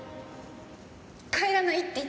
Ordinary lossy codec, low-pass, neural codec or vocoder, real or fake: none; none; none; real